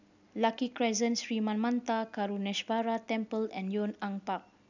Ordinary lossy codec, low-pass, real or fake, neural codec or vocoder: none; 7.2 kHz; real; none